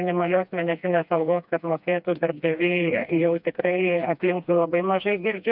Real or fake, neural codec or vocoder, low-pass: fake; codec, 16 kHz, 2 kbps, FreqCodec, smaller model; 5.4 kHz